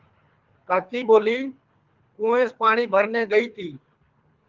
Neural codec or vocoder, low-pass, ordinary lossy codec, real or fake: codec, 24 kHz, 3 kbps, HILCodec; 7.2 kHz; Opus, 32 kbps; fake